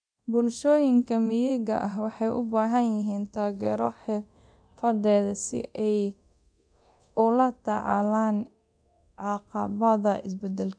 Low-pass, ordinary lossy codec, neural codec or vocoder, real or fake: 9.9 kHz; none; codec, 24 kHz, 0.9 kbps, DualCodec; fake